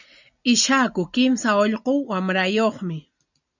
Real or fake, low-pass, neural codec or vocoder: real; 7.2 kHz; none